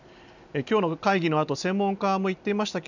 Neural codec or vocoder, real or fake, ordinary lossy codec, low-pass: none; real; none; 7.2 kHz